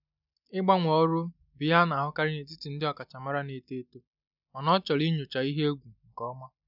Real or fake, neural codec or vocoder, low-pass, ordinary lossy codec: real; none; 5.4 kHz; none